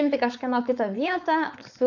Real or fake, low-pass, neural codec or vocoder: fake; 7.2 kHz; codec, 16 kHz, 4.8 kbps, FACodec